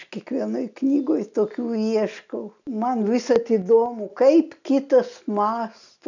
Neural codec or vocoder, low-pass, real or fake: none; 7.2 kHz; real